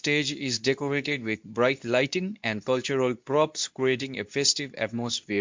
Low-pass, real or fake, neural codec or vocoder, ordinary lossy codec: 7.2 kHz; fake; codec, 24 kHz, 0.9 kbps, WavTokenizer, medium speech release version 2; MP3, 64 kbps